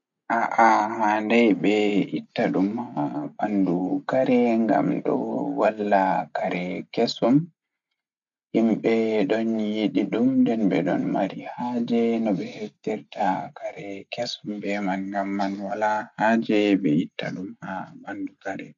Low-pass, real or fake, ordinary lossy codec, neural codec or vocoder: 7.2 kHz; real; none; none